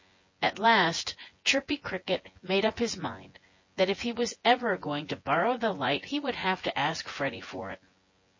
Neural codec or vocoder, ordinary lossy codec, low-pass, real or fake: vocoder, 24 kHz, 100 mel bands, Vocos; MP3, 32 kbps; 7.2 kHz; fake